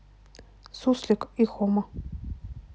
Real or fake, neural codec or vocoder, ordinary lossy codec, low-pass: real; none; none; none